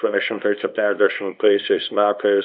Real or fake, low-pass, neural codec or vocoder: fake; 5.4 kHz; codec, 24 kHz, 0.9 kbps, WavTokenizer, small release